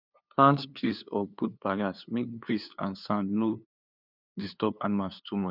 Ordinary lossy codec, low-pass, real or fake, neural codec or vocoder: none; 5.4 kHz; fake; codec, 16 kHz, 2 kbps, FunCodec, trained on LibriTTS, 25 frames a second